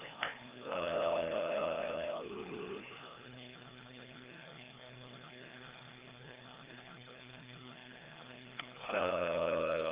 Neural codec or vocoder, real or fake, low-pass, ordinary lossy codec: codec, 16 kHz, 2 kbps, FreqCodec, larger model; fake; 3.6 kHz; Opus, 24 kbps